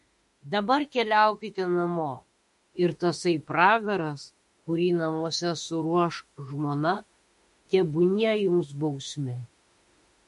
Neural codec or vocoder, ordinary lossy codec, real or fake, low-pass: autoencoder, 48 kHz, 32 numbers a frame, DAC-VAE, trained on Japanese speech; MP3, 48 kbps; fake; 14.4 kHz